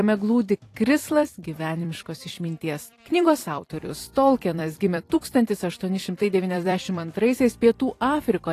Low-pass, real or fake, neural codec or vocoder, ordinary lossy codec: 14.4 kHz; fake; vocoder, 44.1 kHz, 128 mel bands every 256 samples, BigVGAN v2; AAC, 48 kbps